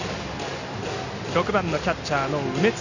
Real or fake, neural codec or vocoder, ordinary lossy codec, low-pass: real; none; none; 7.2 kHz